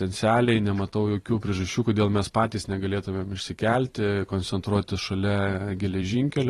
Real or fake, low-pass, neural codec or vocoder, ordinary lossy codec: fake; 19.8 kHz; vocoder, 44.1 kHz, 128 mel bands every 512 samples, BigVGAN v2; AAC, 32 kbps